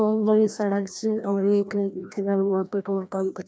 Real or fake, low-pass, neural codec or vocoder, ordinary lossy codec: fake; none; codec, 16 kHz, 1 kbps, FreqCodec, larger model; none